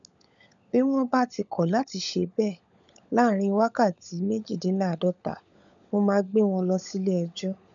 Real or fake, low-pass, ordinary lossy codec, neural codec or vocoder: fake; 7.2 kHz; none; codec, 16 kHz, 16 kbps, FunCodec, trained on LibriTTS, 50 frames a second